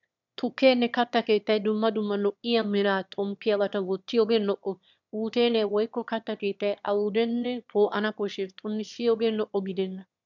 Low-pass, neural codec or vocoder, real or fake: 7.2 kHz; autoencoder, 22.05 kHz, a latent of 192 numbers a frame, VITS, trained on one speaker; fake